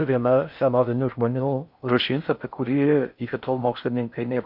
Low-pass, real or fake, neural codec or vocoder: 5.4 kHz; fake; codec, 16 kHz in and 24 kHz out, 0.6 kbps, FocalCodec, streaming, 4096 codes